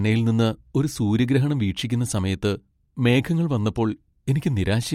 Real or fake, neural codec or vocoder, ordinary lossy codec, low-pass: real; none; MP3, 64 kbps; 19.8 kHz